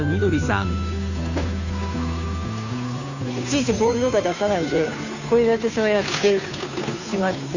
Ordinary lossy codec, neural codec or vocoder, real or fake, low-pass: none; codec, 16 kHz, 2 kbps, FunCodec, trained on Chinese and English, 25 frames a second; fake; 7.2 kHz